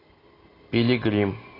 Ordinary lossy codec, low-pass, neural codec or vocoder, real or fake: AAC, 24 kbps; 5.4 kHz; none; real